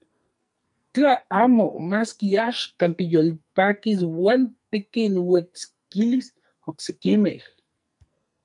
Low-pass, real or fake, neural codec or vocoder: 10.8 kHz; fake; codec, 44.1 kHz, 2.6 kbps, SNAC